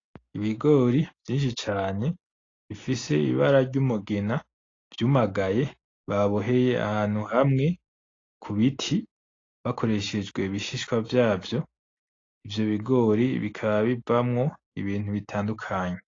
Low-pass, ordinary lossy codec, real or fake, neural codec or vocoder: 7.2 kHz; AAC, 32 kbps; real; none